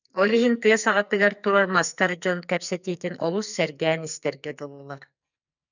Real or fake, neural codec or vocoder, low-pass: fake; codec, 44.1 kHz, 2.6 kbps, SNAC; 7.2 kHz